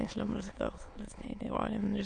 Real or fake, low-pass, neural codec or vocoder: fake; 9.9 kHz; autoencoder, 22.05 kHz, a latent of 192 numbers a frame, VITS, trained on many speakers